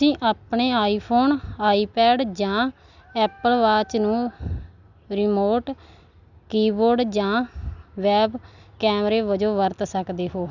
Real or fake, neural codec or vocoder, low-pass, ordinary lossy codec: real; none; 7.2 kHz; none